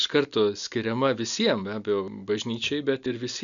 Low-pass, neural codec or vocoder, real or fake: 7.2 kHz; none; real